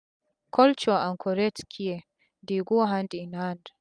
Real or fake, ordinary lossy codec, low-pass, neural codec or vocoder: real; none; 9.9 kHz; none